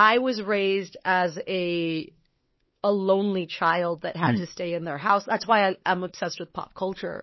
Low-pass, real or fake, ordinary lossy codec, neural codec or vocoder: 7.2 kHz; fake; MP3, 24 kbps; codec, 16 kHz, 4 kbps, X-Codec, WavLM features, trained on Multilingual LibriSpeech